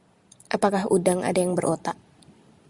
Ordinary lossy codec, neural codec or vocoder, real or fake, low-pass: Opus, 64 kbps; none; real; 10.8 kHz